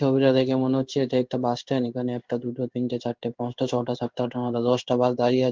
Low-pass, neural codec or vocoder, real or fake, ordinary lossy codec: 7.2 kHz; codec, 16 kHz in and 24 kHz out, 1 kbps, XY-Tokenizer; fake; Opus, 32 kbps